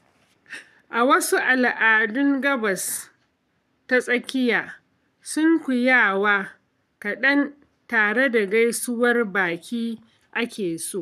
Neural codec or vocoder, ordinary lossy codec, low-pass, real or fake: codec, 44.1 kHz, 7.8 kbps, Pupu-Codec; none; 14.4 kHz; fake